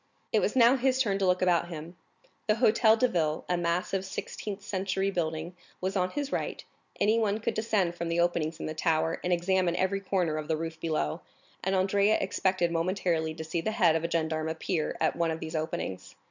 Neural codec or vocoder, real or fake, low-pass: none; real; 7.2 kHz